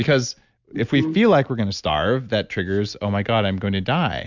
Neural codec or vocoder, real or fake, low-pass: none; real; 7.2 kHz